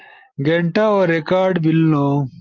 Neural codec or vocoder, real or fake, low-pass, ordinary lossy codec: none; real; 7.2 kHz; Opus, 24 kbps